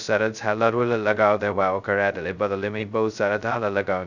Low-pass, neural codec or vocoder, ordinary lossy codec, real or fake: 7.2 kHz; codec, 16 kHz, 0.2 kbps, FocalCodec; none; fake